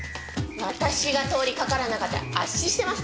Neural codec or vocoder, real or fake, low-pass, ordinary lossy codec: none; real; none; none